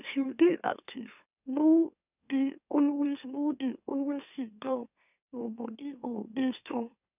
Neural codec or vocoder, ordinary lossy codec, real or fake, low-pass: autoencoder, 44.1 kHz, a latent of 192 numbers a frame, MeloTTS; none; fake; 3.6 kHz